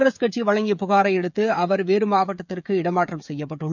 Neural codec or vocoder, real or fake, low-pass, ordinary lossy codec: codec, 16 kHz, 16 kbps, FreqCodec, smaller model; fake; 7.2 kHz; none